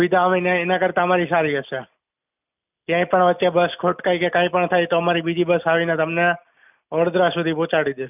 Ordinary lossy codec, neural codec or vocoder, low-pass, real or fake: none; none; 3.6 kHz; real